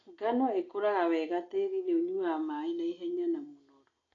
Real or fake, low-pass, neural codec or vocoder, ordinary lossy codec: real; 7.2 kHz; none; AAC, 32 kbps